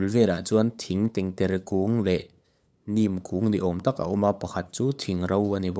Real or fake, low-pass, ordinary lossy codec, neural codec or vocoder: fake; none; none; codec, 16 kHz, 8 kbps, FunCodec, trained on LibriTTS, 25 frames a second